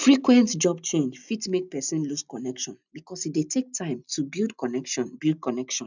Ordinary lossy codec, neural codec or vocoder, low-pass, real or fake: none; none; 7.2 kHz; real